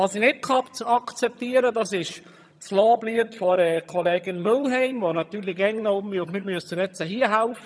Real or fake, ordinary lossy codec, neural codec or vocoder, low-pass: fake; none; vocoder, 22.05 kHz, 80 mel bands, HiFi-GAN; none